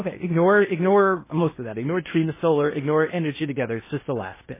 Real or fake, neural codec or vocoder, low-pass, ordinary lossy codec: fake; codec, 16 kHz in and 24 kHz out, 0.8 kbps, FocalCodec, streaming, 65536 codes; 3.6 kHz; MP3, 16 kbps